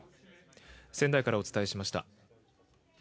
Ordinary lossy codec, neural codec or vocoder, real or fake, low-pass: none; none; real; none